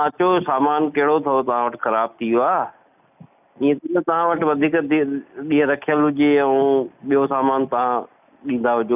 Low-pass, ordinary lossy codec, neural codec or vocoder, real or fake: 3.6 kHz; none; none; real